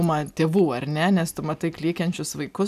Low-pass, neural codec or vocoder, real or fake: 14.4 kHz; none; real